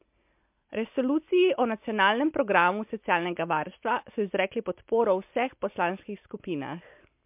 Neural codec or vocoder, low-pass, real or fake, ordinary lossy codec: none; 3.6 kHz; real; MP3, 32 kbps